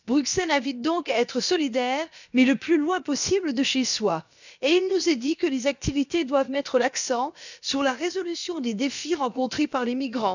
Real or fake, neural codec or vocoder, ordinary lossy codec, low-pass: fake; codec, 16 kHz, about 1 kbps, DyCAST, with the encoder's durations; none; 7.2 kHz